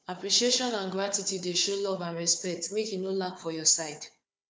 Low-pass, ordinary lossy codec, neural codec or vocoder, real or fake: none; none; codec, 16 kHz, 4 kbps, FunCodec, trained on Chinese and English, 50 frames a second; fake